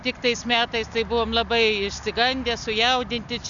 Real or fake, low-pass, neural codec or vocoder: real; 7.2 kHz; none